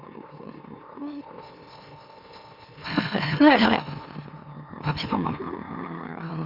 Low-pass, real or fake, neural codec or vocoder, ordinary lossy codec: 5.4 kHz; fake; autoencoder, 44.1 kHz, a latent of 192 numbers a frame, MeloTTS; Opus, 64 kbps